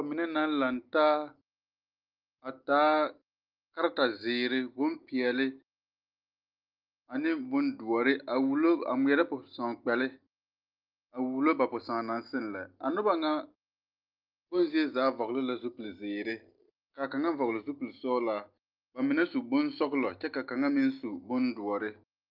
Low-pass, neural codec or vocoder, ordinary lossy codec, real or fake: 5.4 kHz; none; Opus, 32 kbps; real